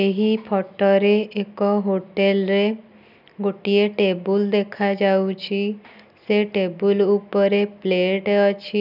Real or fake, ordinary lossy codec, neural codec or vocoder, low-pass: real; none; none; 5.4 kHz